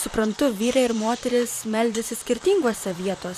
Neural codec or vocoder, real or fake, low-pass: vocoder, 44.1 kHz, 128 mel bands, Pupu-Vocoder; fake; 14.4 kHz